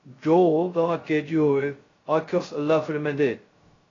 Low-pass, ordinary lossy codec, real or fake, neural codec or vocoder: 7.2 kHz; AAC, 32 kbps; fake; codec, 16 kHz, 0.2 kbps, FocalCodec